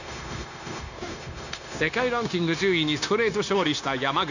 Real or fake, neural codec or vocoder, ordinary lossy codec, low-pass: fake; codec, 16 kHz, 0.9 kbps, LongCat-Audio-Codec; none; 7.2 kHz